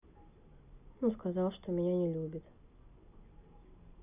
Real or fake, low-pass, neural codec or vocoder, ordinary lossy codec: real; 3.6 kHz; none; none